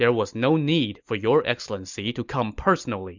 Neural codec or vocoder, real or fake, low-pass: none; real; 7.2 kHz